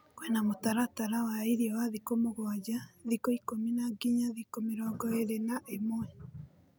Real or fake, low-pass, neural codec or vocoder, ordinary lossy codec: real; none; none; none